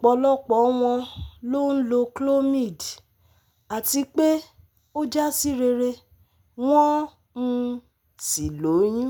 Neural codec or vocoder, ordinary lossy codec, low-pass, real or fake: none; none; none; real